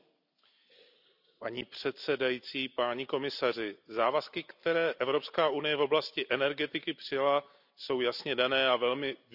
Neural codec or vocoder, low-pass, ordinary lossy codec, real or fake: none; 5.4 kHz; none; real